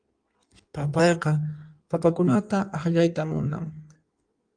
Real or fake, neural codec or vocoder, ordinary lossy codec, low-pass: fake; codec, 16 kHz in and 24 kHz out, 1.1 kbps, FireRedTTS-2 codec; Opus, 32 kbps; 9.9 kHz